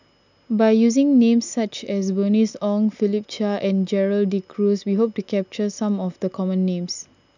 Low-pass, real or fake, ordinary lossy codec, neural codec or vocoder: 7.2 kHz; real; none; none